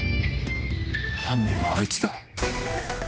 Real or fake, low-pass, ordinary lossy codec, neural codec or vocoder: fake; none; none; codec, 16 kHz, 1 kbps, X-Codec, HuBERT features, trained on general audio